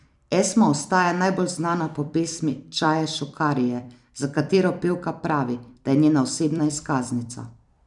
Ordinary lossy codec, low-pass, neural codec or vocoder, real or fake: none; 10.8 kHz; vocoder, 44.1 kHz, 128 mel bands every 256 samples, BigVGAN v2; fake